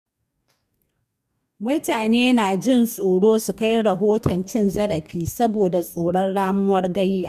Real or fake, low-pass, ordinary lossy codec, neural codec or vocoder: fake; 14.4 kHz; none; codec, 44.1 kHz, 2.6 kbps, DAC